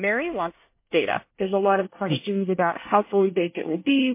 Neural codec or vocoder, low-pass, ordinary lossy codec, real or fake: codec, 24 kHz, 1 kbps, SNAC; 3.6 kHz; MP3, 24 kbps; fake